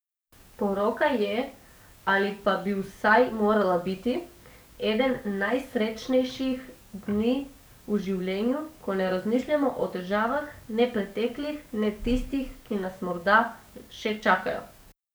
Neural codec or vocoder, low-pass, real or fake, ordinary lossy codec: codec, 44.1 kHz, 7.8 kbps, DAC; none; fake; none